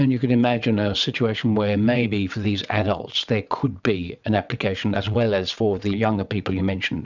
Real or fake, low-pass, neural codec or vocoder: fake; 7.2 kHz; vocoder, 22.05 kHz, 80 mel bands, WaveNeXt